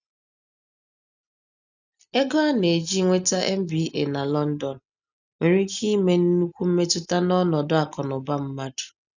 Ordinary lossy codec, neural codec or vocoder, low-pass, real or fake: none; none; 7.2 kHz; real